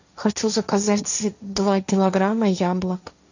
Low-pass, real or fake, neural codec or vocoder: 7.2 kHz; fake; codec, 16 kHz, 1.1 kbps, Voila-Tokenizer